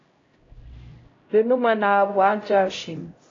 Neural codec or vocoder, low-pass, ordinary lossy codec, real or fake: codec, 16 kHz, 0.5 kbps, X-Codec, HuBERT features, trained on LibriSpeech; 7.2 kHz; AAC, 32 kbps; fake